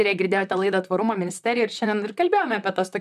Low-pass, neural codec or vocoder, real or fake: 14.4 kHz; vocoder, 44.1 kHz, 128 mel bands, Pupu-Vocoder; fake